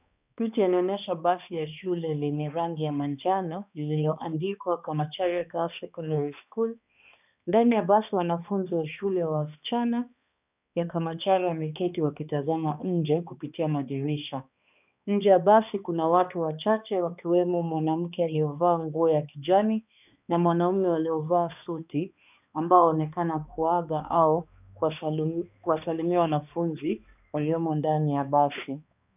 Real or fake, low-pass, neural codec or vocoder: fake; 3.6 kHz; codec, 16 kHz, 2 kbps, X-Codec, HuBERT features, trained on balanced general audio